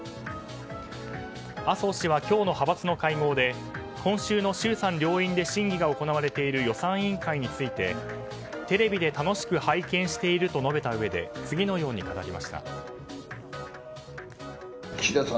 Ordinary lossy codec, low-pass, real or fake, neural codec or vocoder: none; none; real; none